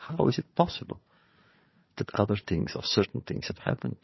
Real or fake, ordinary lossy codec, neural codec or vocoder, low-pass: fake; MP3, 24 kbps; codec, 16 kHz, 4 kbps, FunCodec, trained on Chinese and English, 50 frames a second; 7.2 kHz